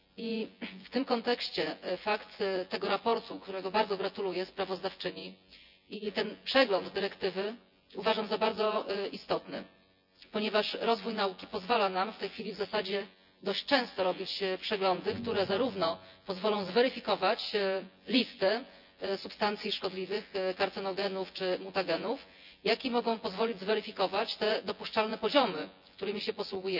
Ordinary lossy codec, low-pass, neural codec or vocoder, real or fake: none; 5.4 kHz; vocoder, 24 kHz, 100 mel bands, Vocos; fake